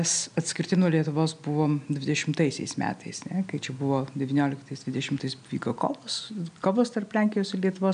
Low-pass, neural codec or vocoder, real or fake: 9.9 kHz; none; real